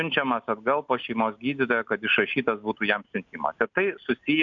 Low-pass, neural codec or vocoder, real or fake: 7.2 kHz; none; real